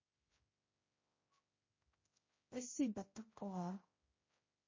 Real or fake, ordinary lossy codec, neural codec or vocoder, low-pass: fake; MP3, 32 kbps; codec, 16 kHz, 0.5 kbps, X-Codec, HuBERT features, trained on general audio; 7.2 kHz